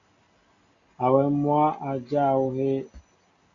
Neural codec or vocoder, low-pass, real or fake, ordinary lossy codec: none; 7.2 kHz; real; Opus, 64 kbps